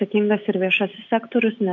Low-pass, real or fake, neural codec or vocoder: 7.2 kHz; real; none